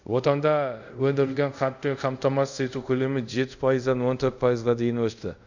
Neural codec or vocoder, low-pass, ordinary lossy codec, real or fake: codec, 24 kHz, 0.5 kbps, DualCodec; 7.2 kHz; none; fake